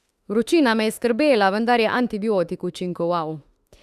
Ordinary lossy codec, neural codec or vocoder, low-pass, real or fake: Opus, 64 kbps; autoencoder, 48 kHz, 32 numbers a frame, DAC-VAE, trained on Japanese speech; 14.4 kHz; fake